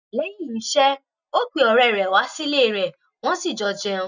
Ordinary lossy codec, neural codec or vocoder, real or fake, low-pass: none; none; real; 7.2 kHz